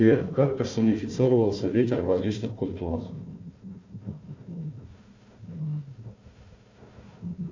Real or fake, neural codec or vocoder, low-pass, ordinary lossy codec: fake; codec, 16 kHz, 1 kbps, FunCodec, trained on Chinese and English, 50 frames a second; 7.2 kHz; MP3, 64 kbps